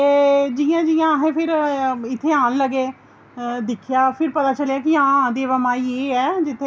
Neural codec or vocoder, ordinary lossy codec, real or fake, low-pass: none; none; real; none